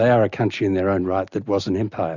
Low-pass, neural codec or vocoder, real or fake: 7.2 kHz; none; real